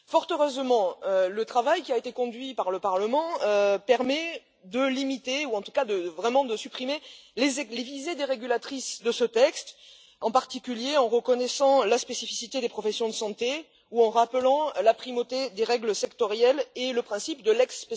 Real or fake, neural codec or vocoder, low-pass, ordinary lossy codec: real; none; none; none